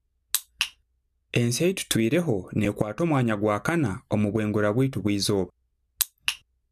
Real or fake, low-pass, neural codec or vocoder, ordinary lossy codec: real; 14.4 kHz; none; none